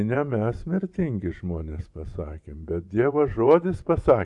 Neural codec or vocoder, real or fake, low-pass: vocoder, 24 kHz, 100 mel bands, Vocos; fake; 10.8 kHz